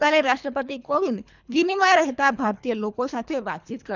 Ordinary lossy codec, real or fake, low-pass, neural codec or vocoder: none; fake; 7.2 kHz; codec, 24 kHz, 3 kbps, HILCodec